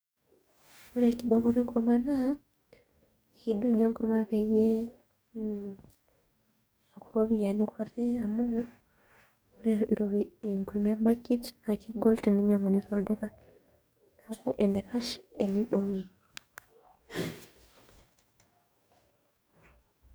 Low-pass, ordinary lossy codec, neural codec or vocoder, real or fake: none; none; codec, 44.1 kHz, 2.6 kbps, DAC; fake